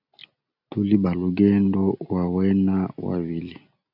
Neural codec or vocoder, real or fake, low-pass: none; real; 5.4 kHz